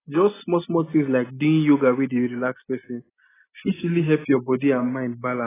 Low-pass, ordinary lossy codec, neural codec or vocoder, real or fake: 3.6 kHz; AAC, 16 kbps; none; real